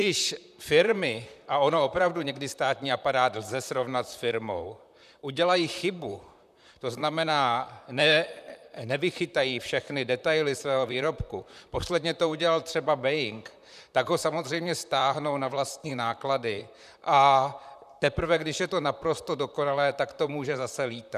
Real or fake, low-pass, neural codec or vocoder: fake; 14.4 kHz; vocoder, 44.1 kHz, 128 mel bands, Pupu-Vocoder